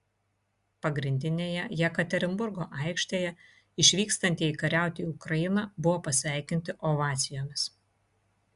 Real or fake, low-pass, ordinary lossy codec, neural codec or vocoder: real; 10.8 kHz; Opus, 64 kbps; none